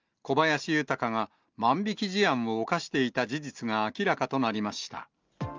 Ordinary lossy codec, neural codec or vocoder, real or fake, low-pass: Opus, 24 kbps; none; real; 7.2 kHz